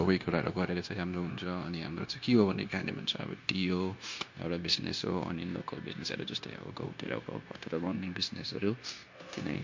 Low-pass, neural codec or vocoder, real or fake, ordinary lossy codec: 7.2 kHz; codec, 16 kHz, 0.9 kbps, LongCat-Audio-Codec; fake; MP3, 48 kbps